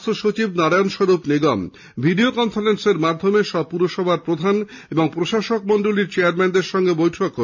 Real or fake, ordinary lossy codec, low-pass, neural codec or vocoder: real; none; 7.2 kHz; none